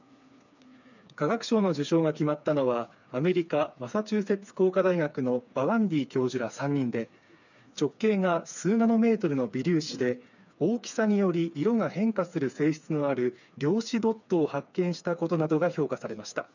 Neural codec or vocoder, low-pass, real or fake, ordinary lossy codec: codec, 16 kHz, 4 kbps, FreqCodec, smaller model; 7.2 kHz; fake; none